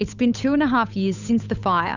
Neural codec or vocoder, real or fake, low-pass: codec, 16 kHz, 8 kbps, FunCodec, trained on Chinese and English, 25 frames a second; fake; 7.2 kHz